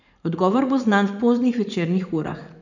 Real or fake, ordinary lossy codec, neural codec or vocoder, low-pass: fake; none; vocoder, 44.1 kHz, 80 mel bands, Vocos; 7.2 kHz